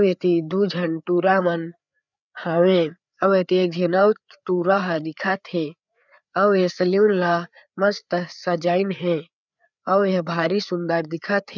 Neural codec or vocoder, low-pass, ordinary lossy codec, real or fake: codec, 16 kHz, 4 kbps, FreqCodec, larger model; 7.2 kHz; none; fake